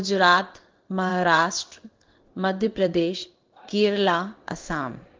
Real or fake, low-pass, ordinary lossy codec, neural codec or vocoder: fake; 7.2 kHz; Opus, 32 kbps; codec, 16 kHz in and 24 kHz out, 1 kbps, XY-Tokenizer